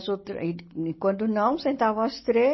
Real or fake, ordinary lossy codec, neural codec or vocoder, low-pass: real; MP3, 24 kbps; none; 7.2 kHz